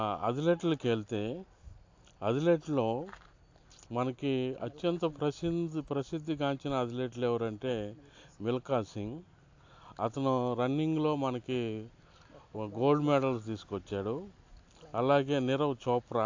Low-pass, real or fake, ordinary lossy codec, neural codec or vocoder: 7.2 kHz; real; AAC, 48 kbps; none